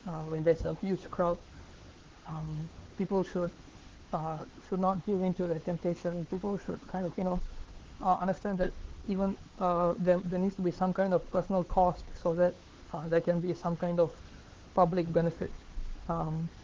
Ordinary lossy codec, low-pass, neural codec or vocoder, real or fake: Opus, 16 kbps; 7.2 kHz; codec, 16 kHz, 4 kbps, X-Codec, HuBERT features, trained on LibriSpeech; fake